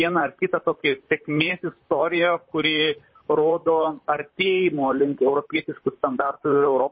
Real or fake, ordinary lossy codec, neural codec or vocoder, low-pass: fake; MP3, 24 kbps; vocoder, 44.1 kHz, 128 mel bands, Pupu-Vocoder; 7.2 kHz